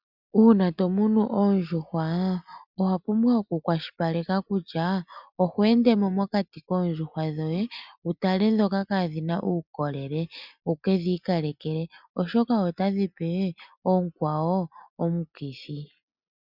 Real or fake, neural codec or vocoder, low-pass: real; none; 5.4 kHz